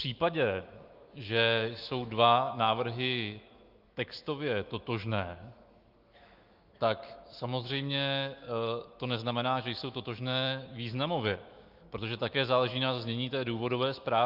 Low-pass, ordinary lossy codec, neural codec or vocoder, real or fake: 5.4 kHz; Opus, 32 kbps; autoencoder, 48 kHz, 128 numbers a frame, DAC-VAE, trained on Japanese speech; fake